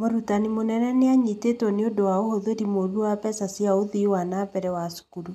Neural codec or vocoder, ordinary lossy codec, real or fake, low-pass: none; none; real; 14.4 kHz